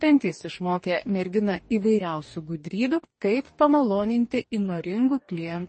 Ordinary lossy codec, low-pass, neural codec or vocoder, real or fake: MP3, 32 kbps; 9.9 kHz; codec, 44.1 kHz, 2.6 kbps, DAC; fake